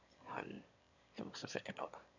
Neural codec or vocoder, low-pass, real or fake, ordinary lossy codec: autoencoder, 22.05 kHz, a latent of 192 numbers a frame, VITS, trained on one speaker; 7.2 kHz; fake; none